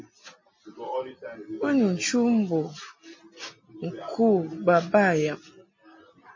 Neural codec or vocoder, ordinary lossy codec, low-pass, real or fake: none; MP3, 32 kbps; 7.2 kHz; real